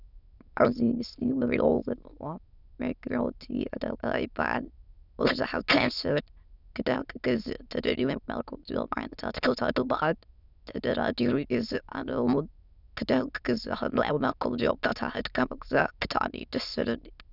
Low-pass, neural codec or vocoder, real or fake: 5.4 kHz; autoencoder, 22.05 kHz, a latent of 192 numbers a frame, VITS, trained on many speakers; fake